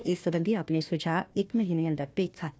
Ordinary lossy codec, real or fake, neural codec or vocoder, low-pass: none; fake; codec, 16 kHz, 1 kbps, FunCodec, trained on Chinese and English, 50 frames a second; none